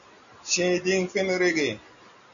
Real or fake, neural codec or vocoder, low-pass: real; none; 7.2 kHz